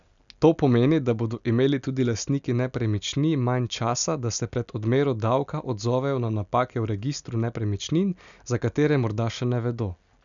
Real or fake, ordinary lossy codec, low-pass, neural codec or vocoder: real; none; 7.2 kHz; none